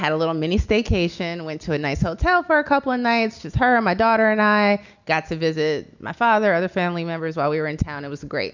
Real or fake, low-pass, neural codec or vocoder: real; 7.2 kHz; none